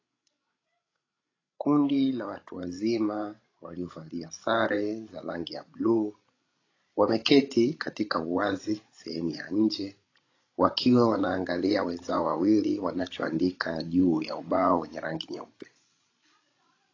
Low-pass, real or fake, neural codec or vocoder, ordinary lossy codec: 7.2 kHz; fake; codec, 16 kHz, 16 kbps, FreqCodec, larger model; AAC, 32 kbps